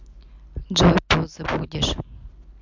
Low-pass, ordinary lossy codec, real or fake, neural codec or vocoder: 7.2 kHz; MP3, 64 kbps; real; none